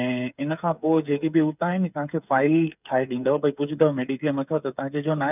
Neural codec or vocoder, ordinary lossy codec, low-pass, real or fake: codec, 16 kHz, 8 kbps, FreqCodec, smaller model; none; 3.6 kHz; fake